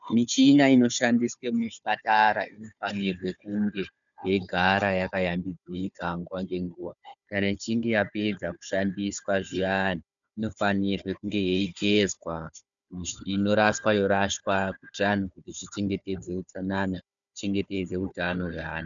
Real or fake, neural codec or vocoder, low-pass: fake; codec, 16 kHz, 4 kbps, FunCodec, trained on Chinese and English, 50 frames a second; 7.2 kHz